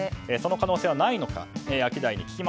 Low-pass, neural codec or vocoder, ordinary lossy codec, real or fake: none; none; none; real